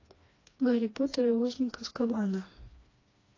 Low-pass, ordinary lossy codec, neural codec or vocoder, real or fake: 7.2 kHz; AAC, 32 kbps; codec, 16 kHz, 2 kbps, FreqCodec, smaller model; fake